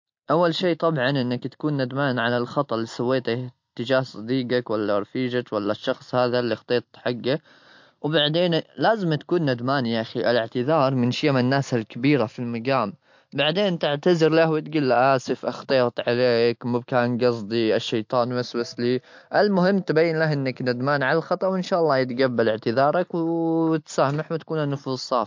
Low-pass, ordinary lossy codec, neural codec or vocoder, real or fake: 7.2 kHz; MP3, 48 kbps; none; real